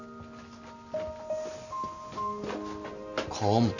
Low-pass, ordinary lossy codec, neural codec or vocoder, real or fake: 7.2 kHz; none; none; real